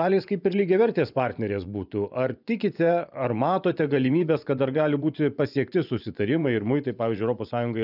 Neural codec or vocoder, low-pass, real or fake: vocoder, 24 kHz, 100 mel bands, Vocos; 5.4 kHz; fake